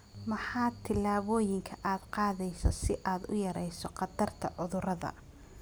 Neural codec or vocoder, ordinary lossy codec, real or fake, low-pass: none; none; real; none